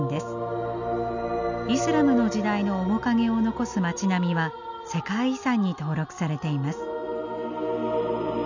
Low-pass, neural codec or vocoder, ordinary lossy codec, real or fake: 7.2 kHz; none; none; real